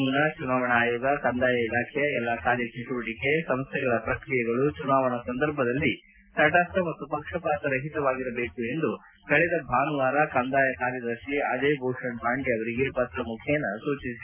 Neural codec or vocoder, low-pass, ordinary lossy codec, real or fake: none; 3.6 kHz; MP3, 24 kbps; real